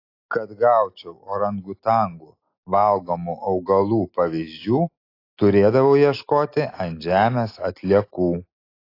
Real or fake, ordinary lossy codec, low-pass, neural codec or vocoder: real; AAC, 32 kbps; 5.4 kHz; none